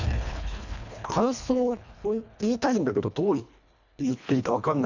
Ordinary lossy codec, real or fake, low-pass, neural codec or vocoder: none; fake; 7.2 kHz; codec, 24 kHz, 1.5 kbps, HILCodec